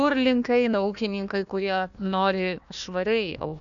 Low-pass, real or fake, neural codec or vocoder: 7.2 kHz; fake; codec, 16 kHz, 1 kbps, FunCodec, trained on Chinese and English, 50 frames a second